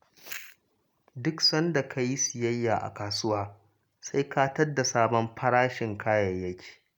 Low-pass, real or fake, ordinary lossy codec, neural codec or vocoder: none; real; none; none